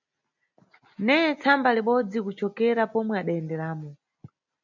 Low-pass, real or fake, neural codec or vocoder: 7.2 kHz; real; none